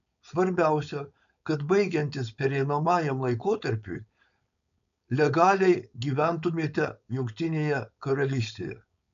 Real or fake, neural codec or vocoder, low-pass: fake; codec, 16 kHz, 4.8 kbps, FACodec; 7.2 kHz